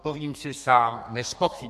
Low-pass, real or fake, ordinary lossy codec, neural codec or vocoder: 14.4 kHz; fake; AAC, 96 kbps; codec, 32 kHz, 1.9 kbps, SNAC